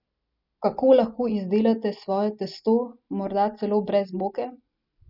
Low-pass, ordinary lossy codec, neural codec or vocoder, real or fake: 5.4 kHz; none; none; real